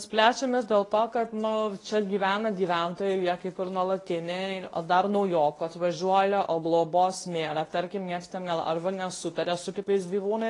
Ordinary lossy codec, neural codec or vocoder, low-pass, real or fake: AAC, 32 kbps; codec, 24 kHz, 0.9 kbps, WavTokenizer, medium speech release version 2; 10.8 kHz; fake